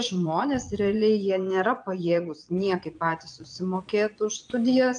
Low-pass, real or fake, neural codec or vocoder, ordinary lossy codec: 9.9 kHz; fake; vocoder, 22.05 kHz, 80 mel bands, Vocos; AAC, 64 kbps